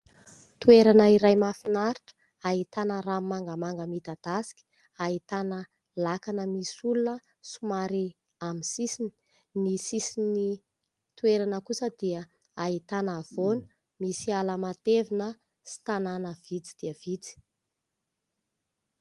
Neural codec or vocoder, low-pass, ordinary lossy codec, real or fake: none; 10.8 kHz; Opus, 24 kbps; real